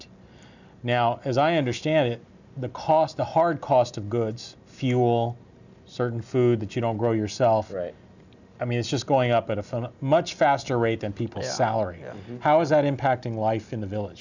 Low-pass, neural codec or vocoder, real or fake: 7.2 kHz; none; real